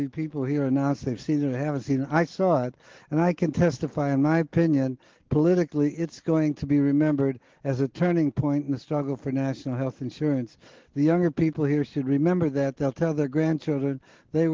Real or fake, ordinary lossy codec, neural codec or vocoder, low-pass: real; Opus, 16 kbps; none; 7.2 kHz